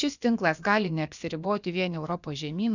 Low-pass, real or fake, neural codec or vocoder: 7.2 kHz; fake; codec, 16 kHz, about 1 kbps, DyCAST, with the encoder's durations